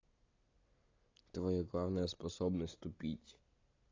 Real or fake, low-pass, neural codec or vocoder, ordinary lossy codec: real; 7.2 kHz; none; AAC, 32 kbps